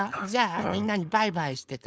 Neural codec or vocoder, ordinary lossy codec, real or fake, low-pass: codec, 16 kHz, 4.8 kbps, FACodec; none; fake; none